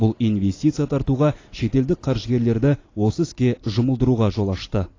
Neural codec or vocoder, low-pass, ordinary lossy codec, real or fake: none; 7.2 kHz; AAC, 32 kbps; real